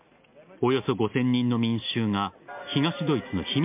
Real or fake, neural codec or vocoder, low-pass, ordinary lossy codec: real; none; 3.6 kHz; MP3, 32 kbps